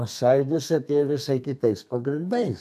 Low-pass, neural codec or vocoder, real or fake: 14.4 kHz; codec, 32 kHz, 1.9 kbps, SNAC; fake